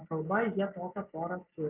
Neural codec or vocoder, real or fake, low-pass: none; real; 3.6 kHz